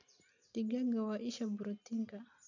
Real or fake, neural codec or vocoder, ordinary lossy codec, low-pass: real; none; none; 7.2 kHz